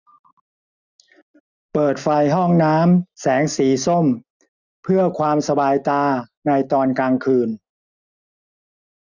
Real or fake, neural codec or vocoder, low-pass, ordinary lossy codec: real; none; 7.2 kHz; none